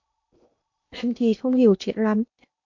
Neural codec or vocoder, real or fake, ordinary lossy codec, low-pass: codec, 16 kHz in and 24 kHz out, 0.8 kbps, FocalCodec, streaming, 65536 codes; fake; MP3, 48 kbps; 7.2 kHz